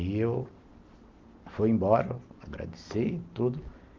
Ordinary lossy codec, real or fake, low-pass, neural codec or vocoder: Opus, 32 kbps; real; 7.2 kHz; none